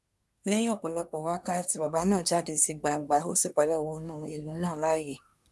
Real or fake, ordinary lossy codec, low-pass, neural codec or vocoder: fake; none; none; codec, 24 kHz, 1 kbps, SNAC